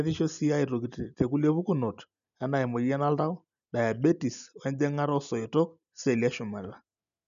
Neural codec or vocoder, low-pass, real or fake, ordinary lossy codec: none; 7.2 kHz; real; none